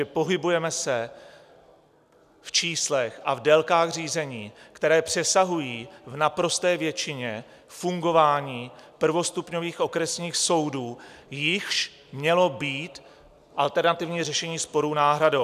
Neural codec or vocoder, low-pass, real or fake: none; 14.4 kHz; real